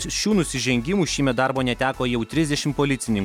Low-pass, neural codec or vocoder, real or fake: 19.8 kHz; none; real